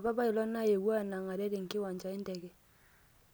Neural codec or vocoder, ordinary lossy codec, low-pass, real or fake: none; none; none; real